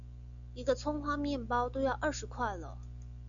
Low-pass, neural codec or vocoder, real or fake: 7.2 kHz; none; real